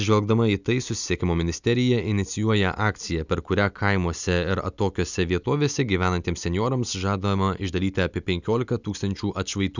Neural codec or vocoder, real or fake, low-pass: none; real; 7.2 kHz